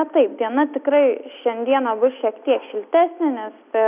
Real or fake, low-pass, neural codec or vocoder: real; 3.6 kHz; none